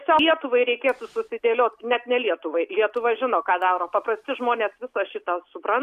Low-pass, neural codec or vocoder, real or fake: 14.4 kHz; none; real